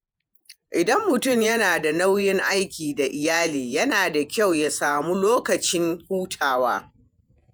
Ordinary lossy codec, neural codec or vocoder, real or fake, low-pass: none; vocoder, 48 kHz, 128 mel bands, Vocos; fake; none